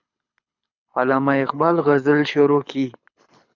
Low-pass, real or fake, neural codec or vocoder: 7.2 kHz; fake; codec, 24 kHz, 6 kbps, HILCodec